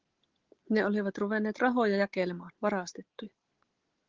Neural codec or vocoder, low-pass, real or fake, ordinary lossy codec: none; 7.2 kHz; real; Opus, 16 kbps